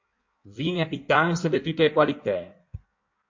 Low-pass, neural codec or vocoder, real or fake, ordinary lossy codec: 7.2 kHz; codec, 16 kHz in and 24 kHz out, 1.1 kbps, FireRedTTS-2 codec; fake; MP3, 48 kbps